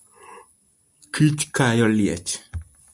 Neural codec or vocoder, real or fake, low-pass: none; real; 10.8 kHz